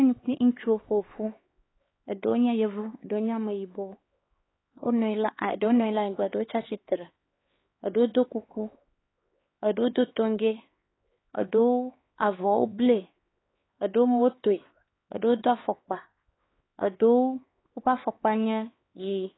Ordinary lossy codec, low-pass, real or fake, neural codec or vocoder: AAC, 16 kbps; 7.2 kHz; fake; codec, 16 kHz, 4 kbps, X-Codec, HuBERT features, trained on LibriSpeech